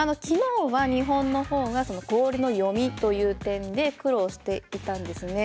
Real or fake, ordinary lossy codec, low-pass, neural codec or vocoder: real; none; none; none